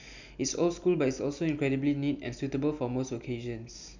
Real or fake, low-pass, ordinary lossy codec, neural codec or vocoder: real; 7.2 kHz; none; none